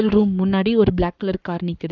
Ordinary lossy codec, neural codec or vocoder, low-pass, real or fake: none; codec, 44.1 kHz, 7.8 kbps, Pupu-Codec; 7.2 kHz; fake